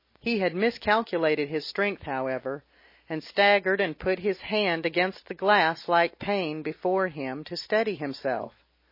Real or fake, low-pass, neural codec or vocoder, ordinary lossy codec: real; 5.4 kHz; none; MP3, 24 kbps